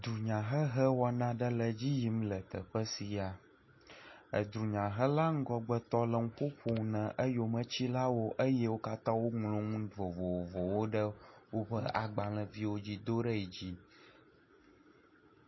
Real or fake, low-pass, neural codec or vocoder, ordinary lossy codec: real; 7.2 kHz; none; MP3, 24 kbps